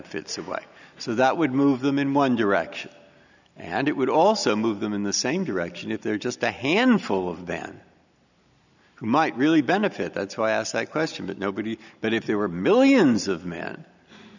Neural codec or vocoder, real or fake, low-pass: none; real; 7.2 kHz